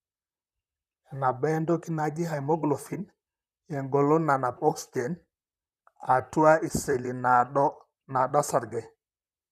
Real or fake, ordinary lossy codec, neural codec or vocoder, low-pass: fake; none; vocoder, 44.1 kHz, 128 mel bands, Pupu-Vocoder; 14.4 kHz